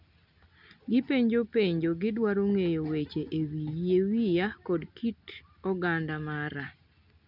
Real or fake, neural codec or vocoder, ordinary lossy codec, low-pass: real; none; none; 5.4 kHz